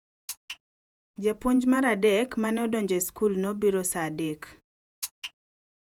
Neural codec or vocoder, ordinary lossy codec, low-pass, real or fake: vocoder, 48 kHz, 128 mel bands, Vocos; none; 19.8 kHz; fake